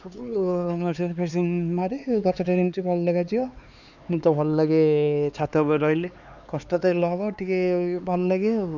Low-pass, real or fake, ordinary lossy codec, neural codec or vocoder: 7.2 kHz; fake; none; codec, 16 kHz, 4 kbps, X-Codec, HuBERT features, trained on LibriSpeech